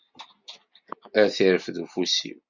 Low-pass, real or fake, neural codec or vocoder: 7.2 kHz; real; none